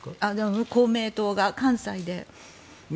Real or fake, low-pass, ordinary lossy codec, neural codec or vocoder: real; none; none; none